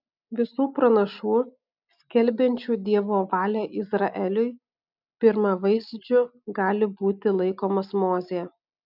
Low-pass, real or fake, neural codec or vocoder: 5.4 kHz; real; none